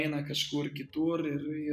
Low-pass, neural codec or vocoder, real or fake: 14.4 kHz; none; real